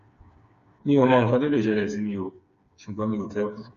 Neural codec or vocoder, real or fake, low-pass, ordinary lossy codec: codec, 16 kHz, 4 kbps, FreqCodec, smaller model; fake; 7.2 kHz; Opus, 64 kbps